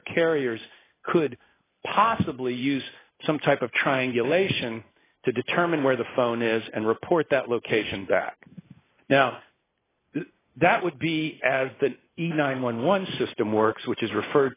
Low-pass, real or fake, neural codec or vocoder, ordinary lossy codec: 3.6 kHz; real; none; AAC, 16 kbps